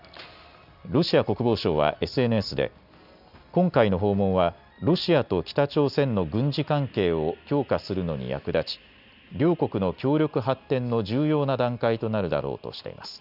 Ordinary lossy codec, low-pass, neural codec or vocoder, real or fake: none; 5.4 kHz; none; real